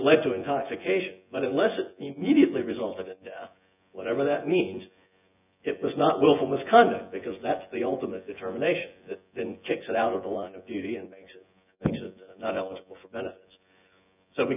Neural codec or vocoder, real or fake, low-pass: vocoder, 24 kHz, 100 mel bands, Vocos; fake; 3.6 kHz